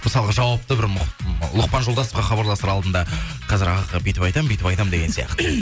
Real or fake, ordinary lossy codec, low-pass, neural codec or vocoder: real; none; none; none